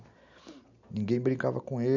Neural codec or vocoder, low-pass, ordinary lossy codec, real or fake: none; 7.2 kHz; none; real